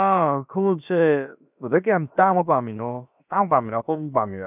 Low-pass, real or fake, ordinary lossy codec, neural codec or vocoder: 3.6 kHz; fake; none; codec, 16 kHz, about 1 kbps, DyCAST, with the encoder's durations